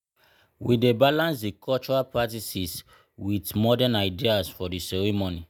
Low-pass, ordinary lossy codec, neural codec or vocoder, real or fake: none; none; none; real